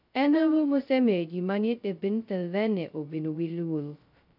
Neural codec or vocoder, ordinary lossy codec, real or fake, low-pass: codec, 16 kHz, 0.2 kbps, FocalCodec; none; fake; 5.4 kHz